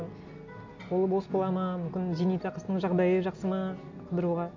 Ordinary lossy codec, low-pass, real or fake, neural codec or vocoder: MP3, 48 kbps; 7.2 kHz; real; none